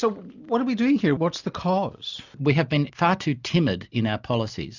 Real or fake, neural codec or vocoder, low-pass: real; none; 7.2 kHz